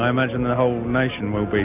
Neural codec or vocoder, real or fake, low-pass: none; real; 3.6 kHz